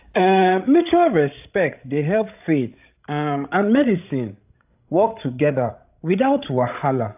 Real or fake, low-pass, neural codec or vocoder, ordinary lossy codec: fake; 3.6 kHz; codec, 16 kHz, 16 kbps, FreqCodec, larger model; AAC, 32 kbps